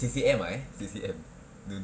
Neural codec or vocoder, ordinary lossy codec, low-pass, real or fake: none; none; none; real